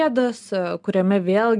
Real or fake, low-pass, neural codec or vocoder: real; 10.8 kHz; none